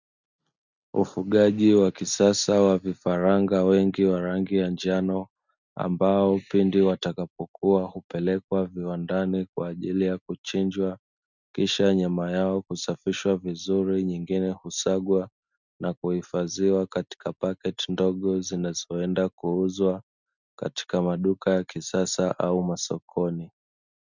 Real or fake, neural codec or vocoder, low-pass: real; none; 7.2 kHz